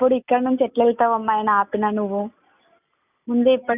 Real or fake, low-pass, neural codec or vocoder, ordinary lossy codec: real; 3.6 kHz; none; none